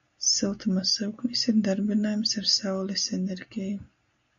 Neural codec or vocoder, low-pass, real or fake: none; 7.2 kHz; real